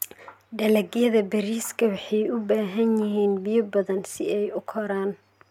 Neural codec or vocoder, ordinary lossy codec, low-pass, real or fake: none; MP3, 96 kbps; 19.8 kHz; real